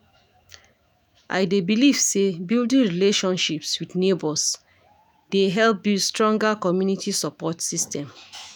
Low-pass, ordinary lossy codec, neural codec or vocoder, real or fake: none; none; autoencoder, 48 kHz, 128 numbers a frame, DAC-VAE, trained on Japanese speech; fake